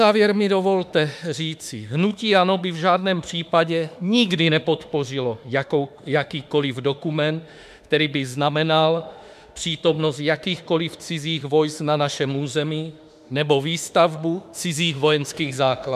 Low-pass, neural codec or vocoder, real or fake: 14.4 kHz; autoencoder, 48 kHz, 32 numbers a frame, DAC-VAE, trained on Japanese speech; fake